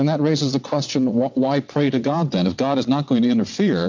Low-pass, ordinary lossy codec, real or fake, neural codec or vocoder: 7.2 kHz; MP3, 64 kbps; fake; vocoder, 22.05 kHz, 80 mel bands, WaveNeXt